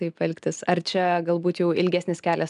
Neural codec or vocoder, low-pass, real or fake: none; 10.8 kHz; real